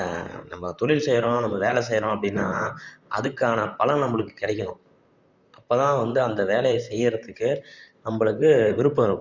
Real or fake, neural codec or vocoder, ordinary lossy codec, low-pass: fake; vocoder, 44.1 kHz, 128 mel bands, Pupu-Vocoder; Opus, 64 kbps; 7.2 kHz